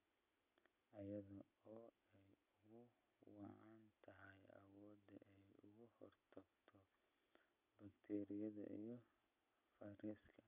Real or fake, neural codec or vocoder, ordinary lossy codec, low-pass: real; none; none; 3.6 kHz